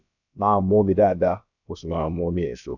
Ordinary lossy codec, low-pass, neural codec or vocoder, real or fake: none; 7.2 kHz; codec, 16 kHz, about 1 kbps, DyCAST, with the encoder's durations; fake